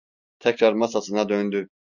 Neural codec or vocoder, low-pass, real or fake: none; 7.2 kHz; real